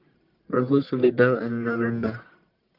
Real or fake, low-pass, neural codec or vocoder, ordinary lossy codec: fake; 5.4 kHz; codec, 44.1 kHz, 1.7 kbps, Pupu-Codec; Opus, 32 kbps